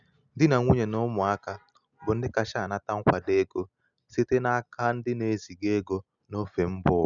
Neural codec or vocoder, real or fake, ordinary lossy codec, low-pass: none; real; none; 7.2 kHz